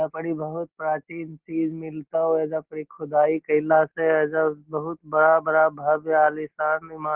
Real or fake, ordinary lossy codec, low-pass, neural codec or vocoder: real; Opus, 16 kbps; 3.6 kHz; none